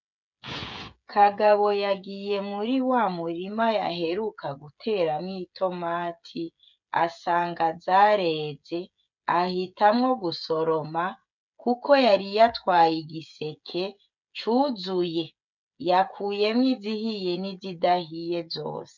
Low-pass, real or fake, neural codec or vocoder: 7.2 kHz; fake; codec, 16 kHz, 16 kbps, FreqCodec, smaller model